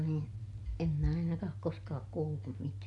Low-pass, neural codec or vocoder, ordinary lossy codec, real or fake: 10.8 kHz; none; none; real